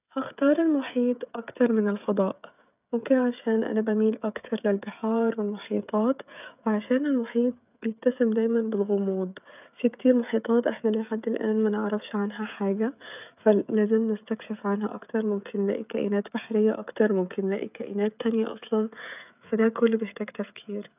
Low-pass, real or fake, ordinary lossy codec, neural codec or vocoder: 3.6 kHz; fake; none; codec, 16 kHz, 16 kbps, FreqCodec, smaller model